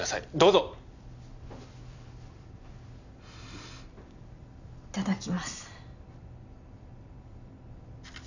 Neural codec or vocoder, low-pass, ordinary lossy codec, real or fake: none; 7.2 kHz; none; real